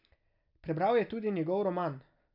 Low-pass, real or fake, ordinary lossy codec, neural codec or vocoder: 5.4 kHz; real; none; none